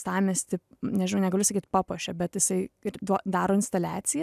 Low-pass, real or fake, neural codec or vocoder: 14.4 kHz; real; none